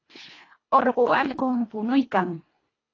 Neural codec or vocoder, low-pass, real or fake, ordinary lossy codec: codec, 24 kHz, 1.5 kbps, HILCodec; 7.2 kHz; fake; AAC, 32 kbps